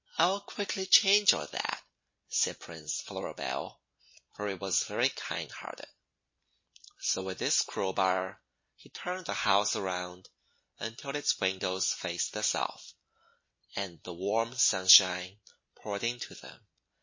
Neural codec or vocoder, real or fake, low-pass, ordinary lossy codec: none; real; 7.2 kHz; MP3, 32 kbps